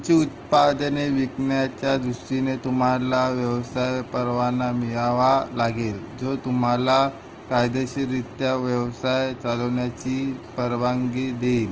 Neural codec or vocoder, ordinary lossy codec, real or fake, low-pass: none; Opus, 16 kbps; real; 7.2 kHz